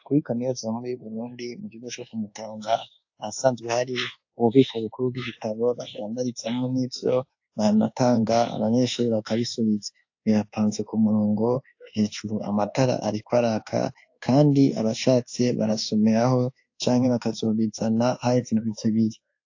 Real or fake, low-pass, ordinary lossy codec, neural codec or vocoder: fake; 7.2 kHz; AAC, 48 kbps; codec, 24 kHz, 1.2 kbps, DualCodec